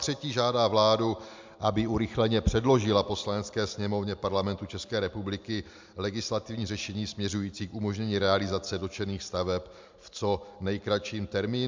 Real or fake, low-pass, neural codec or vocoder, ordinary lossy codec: real; 7.2 kHz; none; MP3, 64 kbps